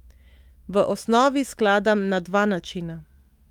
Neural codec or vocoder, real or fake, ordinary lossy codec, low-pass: autoencoder, 48 kHz, 128 numbers a frame, DAC-VAE, trained on Japanese speech; fake; Opus, 32 kbps; 19.8 kHz